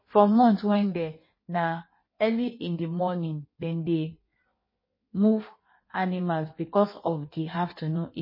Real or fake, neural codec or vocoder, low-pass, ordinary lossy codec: fake; codec, 16 kHz in and 24 kHz out, 1.1 kbps, FireRedTTS-2 codec; 5.4 kHz; MP3, 24 kbps